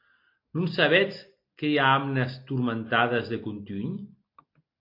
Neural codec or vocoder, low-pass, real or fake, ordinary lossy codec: none; 5.4 kHz; real; MP3, 32 kbps